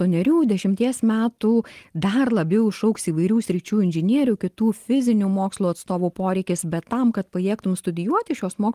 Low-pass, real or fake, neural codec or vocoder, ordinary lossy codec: 14.4 kHz; real; none; Opus, 24 kbps